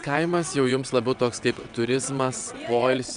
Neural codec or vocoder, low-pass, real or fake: vocoder, 22.05 kHz, 80 mel bands, WaveNeXt; 9.9 kHz; fake